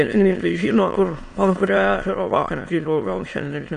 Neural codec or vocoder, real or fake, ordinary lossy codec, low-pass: autoencoder, 22.05 kHz, a latent of 192 numbers a frame, VITS, trained on many speakers; fake; AAC, 48 kbps; 9.9 kHz